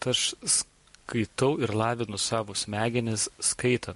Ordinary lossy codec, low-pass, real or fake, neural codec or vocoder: MP3, 48 kbps; 14.4 kHz; real; none